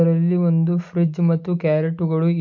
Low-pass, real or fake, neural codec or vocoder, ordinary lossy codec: 7.2 kHz; real; none; none